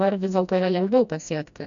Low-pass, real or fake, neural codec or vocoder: 7.2 kHz; fake; codec, 16 kHz, 1 kbps, FreqCodec, smaller model